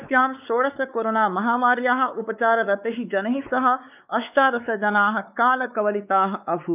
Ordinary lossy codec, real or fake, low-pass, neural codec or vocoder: none; fake; 3.6 kHz; codec, 16 kHz, 4 kbps, X-Codec, WavLM features, trained on Multilingual LibriSpeech